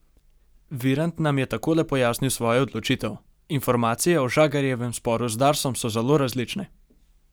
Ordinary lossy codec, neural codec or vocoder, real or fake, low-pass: none; none; real; none